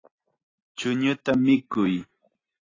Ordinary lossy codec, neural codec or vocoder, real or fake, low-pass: AAC, 32 kbps; none; real; 7.2 kHz